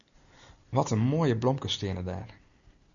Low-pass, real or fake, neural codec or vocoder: 7.2 kHz; real; none